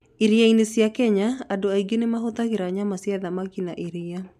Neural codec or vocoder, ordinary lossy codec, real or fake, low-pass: none; MP3, 96 kbps; real; 14.4 kHz